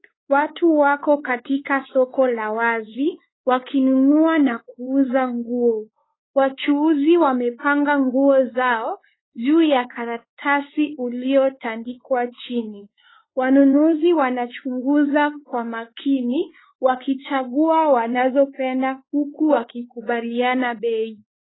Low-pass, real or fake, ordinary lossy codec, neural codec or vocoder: 7.2 kHz; fake; AAC, 16 kbps; codec, 16 kHz, 4 kbps, X-Codec, WavLM features, trained on Multilingual LibriSpeech